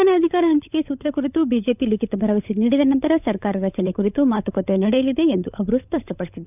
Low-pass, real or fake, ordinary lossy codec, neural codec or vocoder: 3.6 kHz; fake; none; codec, 16 kHz, 16 kbps, FunCodec, trained on LibriTTS, 50 frames a second